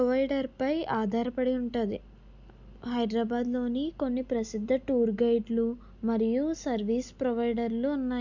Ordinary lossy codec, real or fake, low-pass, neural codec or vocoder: none; real; 7.2 kHz; none